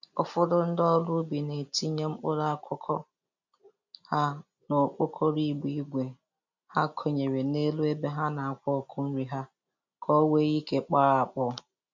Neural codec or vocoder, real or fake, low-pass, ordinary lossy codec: none; real; 7.2 kHz; none